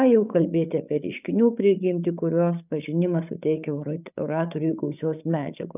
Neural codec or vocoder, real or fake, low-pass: codec, 16 kHz, 8 kbps, FreqCodec, larger model; fake; 3.6 kHz